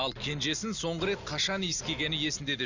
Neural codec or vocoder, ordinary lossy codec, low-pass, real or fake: none; Opus, 64 kbps; 7.2 kHz; real